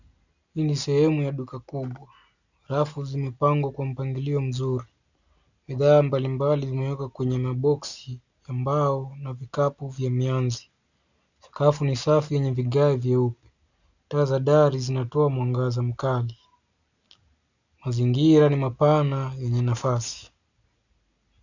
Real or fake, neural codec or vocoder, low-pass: real; none; 7.2 kHz